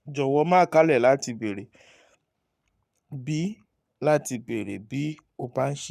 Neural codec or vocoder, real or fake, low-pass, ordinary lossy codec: codec, 44.1 kHz, 7.8 kbps, Pupu-Codec; fake; 14.4 kHz; none